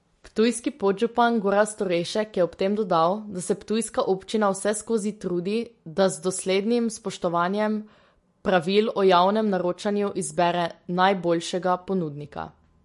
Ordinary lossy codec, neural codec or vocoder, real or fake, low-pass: MP3, 48 kbps; none; real; 14.4 kHz